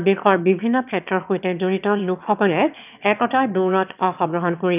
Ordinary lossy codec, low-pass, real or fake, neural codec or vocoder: none; 3.6 kHz; fake; autoencoder, 22.05 kHz, a latent of 192 numbers a frame, VITS, trained on one speaker